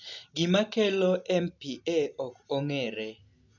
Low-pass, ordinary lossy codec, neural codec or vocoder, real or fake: 7.2 kHz; none; none; real